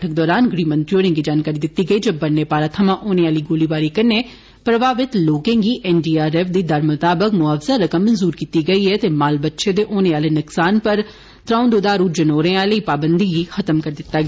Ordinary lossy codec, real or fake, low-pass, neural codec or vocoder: none; real; none; none